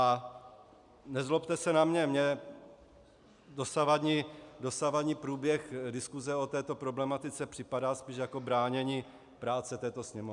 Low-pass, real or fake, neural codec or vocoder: 10.8 kHz; real; none